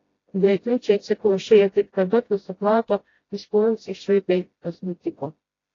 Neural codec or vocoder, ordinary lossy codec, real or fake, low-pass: codec, 16 kHz, 0.5 kbps, FreqCodec, smaller model; AAC, 32 kbps; fake; 7.2 kHz